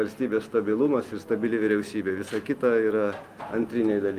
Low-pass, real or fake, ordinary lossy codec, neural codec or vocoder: 14.4 kHz; real; Opus, 32 kbps; none